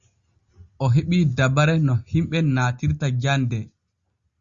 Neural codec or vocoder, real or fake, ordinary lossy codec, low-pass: none; real; Opus, 64 kbps; 7.2 kHz